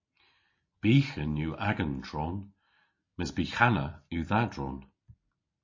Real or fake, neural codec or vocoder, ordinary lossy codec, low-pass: real; none; MP3, 32 kbps; 7.2 kHz